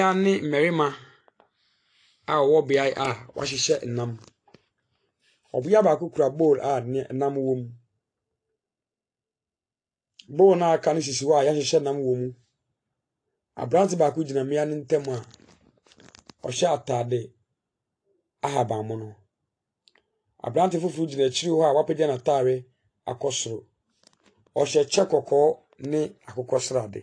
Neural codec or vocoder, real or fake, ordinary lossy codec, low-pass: none; real; AAC, 48 kbps; 9.9 kHz